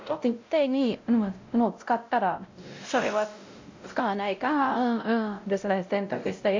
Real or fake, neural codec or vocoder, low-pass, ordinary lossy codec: fake; codec, 16 kHz, 0.5 kbps, X-Codec, WavLM features, trained on Multilingual LibriSpeech; 7.2 kHz; MP3, 64 kbps